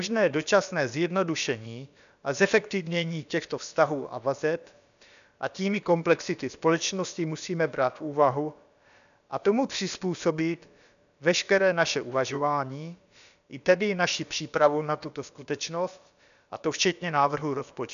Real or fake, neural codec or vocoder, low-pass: fake; codec, 16 kHz, about 1 kbps, DyCAST, with the encoder's durations; 7.2 kHz